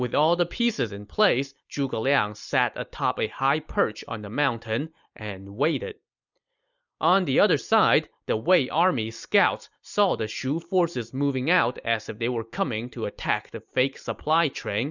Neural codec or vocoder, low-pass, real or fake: none; 7.2 kHz; real